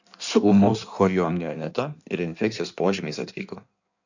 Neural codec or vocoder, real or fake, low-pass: codec, 16 kHz in and 24 kHz out, 1.1 kbps, FireRedTTS-2 codec; fake; 7.2 kHz